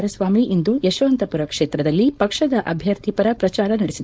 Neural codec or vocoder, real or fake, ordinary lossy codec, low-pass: codec, 16 kHz, 4.8 kbps, FACodec; fake; none; none